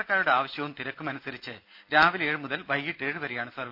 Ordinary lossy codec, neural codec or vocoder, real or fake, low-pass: none; none; real; 5.4 kHz